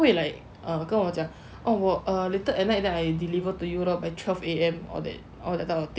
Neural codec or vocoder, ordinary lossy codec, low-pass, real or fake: none; none; none; real